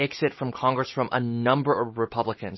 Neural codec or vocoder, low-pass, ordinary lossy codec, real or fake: none; 7.2 kHz; MP3, 24 kbps; real